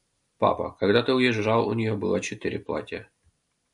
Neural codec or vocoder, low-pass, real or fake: none; 10.8 kHz; real